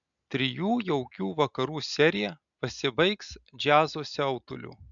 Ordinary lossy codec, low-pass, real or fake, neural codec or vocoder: MP3, 96 kbps; 7.2 kHz; real; none